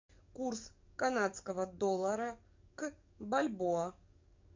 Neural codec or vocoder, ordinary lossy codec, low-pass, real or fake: codec, 44.1 kHz, 7.8 kbps, DAC; MP3, 64 kbps; 7.2 kHz; fake